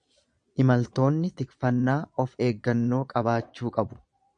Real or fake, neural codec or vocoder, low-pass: fake; vocoder, 22.05 kHz, 80 mel bands, Vocos; 9.9 kHz